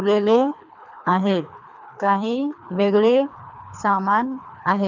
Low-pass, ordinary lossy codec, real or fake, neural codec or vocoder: 7.2 kHz; none; fake; codec, 24 kHz, 3 kbps, HILCodec